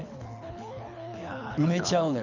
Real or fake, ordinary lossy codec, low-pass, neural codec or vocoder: fake; none; 7.2 kHz; codec, 24 kHz, 6 kbps, HILCodec